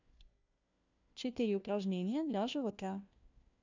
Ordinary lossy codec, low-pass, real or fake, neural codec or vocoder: none; 7.2 kHz; fake; codec, 16 kHz, 1 kbps, FunCodec, trained on LibriTTS, 50 frames a second